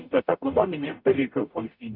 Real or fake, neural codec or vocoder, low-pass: fake; codec, 44.1 kHz, 0.9 kbps, DAC; 5.4 kHz